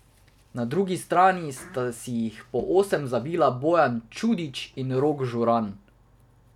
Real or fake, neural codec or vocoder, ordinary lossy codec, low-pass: real; none; none; 19.8 kHz